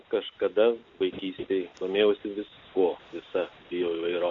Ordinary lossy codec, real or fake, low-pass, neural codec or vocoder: MP3, 48 kbps; real; 7.2 kHz; none